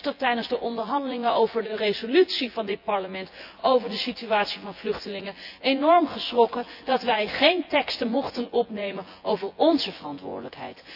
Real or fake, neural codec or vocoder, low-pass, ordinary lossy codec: fake; vocoder, 24 kHz, 100 mel bands, Vocos; 5.4 kHz; AAC, 48 kbps